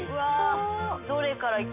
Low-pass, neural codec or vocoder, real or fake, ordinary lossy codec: 3.6 kHz; none; real; MP3, 32 kbps